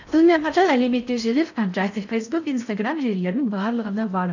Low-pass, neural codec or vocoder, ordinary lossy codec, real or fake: 7.2 kHz; codec, 16 kHz in and 24 kHz out, 0.6 kbps, FocalCodec, streaming, 4096 codes; none; fake